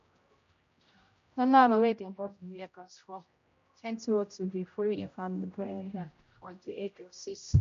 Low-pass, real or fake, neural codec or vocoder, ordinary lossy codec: 7.2 kHz; fake; codec, 16 kHz, 0.5 kbps, X-Codec, HuBERT features, trained on general audio; MP3, 48 kbps